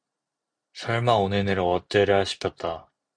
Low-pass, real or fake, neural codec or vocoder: 9.9 kHz; fake; vocoder, 44.1 kHz, 128 mel bands every 256 samples, BigVGAN v2